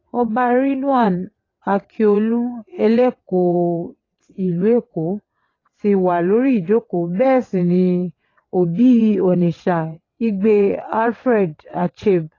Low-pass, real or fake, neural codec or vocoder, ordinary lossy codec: 7.2 kHz; fake; vocoder, 22.05 kHz, 80 mel bands, WaveNeXt; AAC, 32 kbps